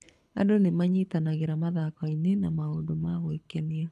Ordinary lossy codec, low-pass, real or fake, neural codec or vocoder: none; none; fake; codec, 24 kHz, 6 kbps, HILCodec